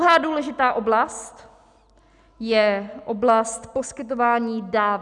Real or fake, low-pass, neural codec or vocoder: real; 10.8 kHz; none